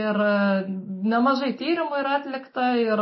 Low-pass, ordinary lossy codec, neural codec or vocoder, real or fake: 7.2 kHz; MP3, 24 kbps; none; real